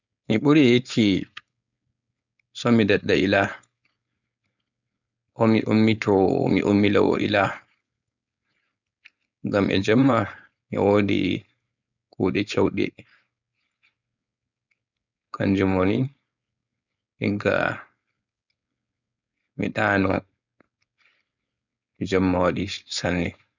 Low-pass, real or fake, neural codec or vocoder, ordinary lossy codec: 7.2 kHz; fake; codec, 16 kHz, 4.8 kbps, FACodec; none